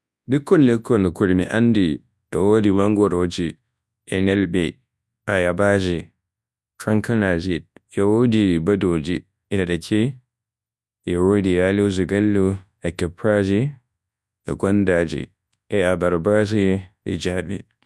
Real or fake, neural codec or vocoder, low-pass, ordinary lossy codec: fake; codec, 24 kHz, 0.9 kbps, WavTokenizer, large speech release; none; none